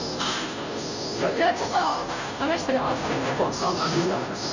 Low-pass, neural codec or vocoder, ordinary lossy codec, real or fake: 7.2 kHz; codec, 16 kHz, 0.5 kbps, FunCodec, trained on Chinese and English, 25 frames a second; none; fake